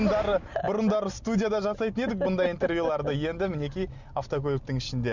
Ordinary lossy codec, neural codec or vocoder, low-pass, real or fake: none; none; 7.2 kHz; real